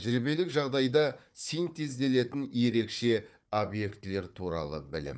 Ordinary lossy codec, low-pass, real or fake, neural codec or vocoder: none; none; fake; codec, 16 kHz, 4 kbps, FunCodec, trained on Chinese and English, 50 frames a second